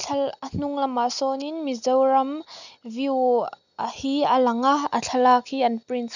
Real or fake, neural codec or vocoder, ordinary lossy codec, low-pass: real; none; none; 7.2 kHz